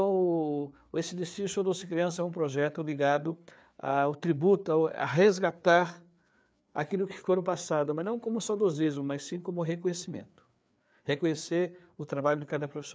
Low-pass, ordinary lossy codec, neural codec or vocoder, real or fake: none; none; codec, 16 kHz, 4 kbps, FreqCodec, larger model; fake